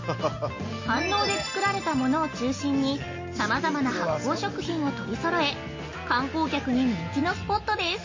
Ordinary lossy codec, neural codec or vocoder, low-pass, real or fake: MP3, 32 kbps; none; 7.2 kHz; real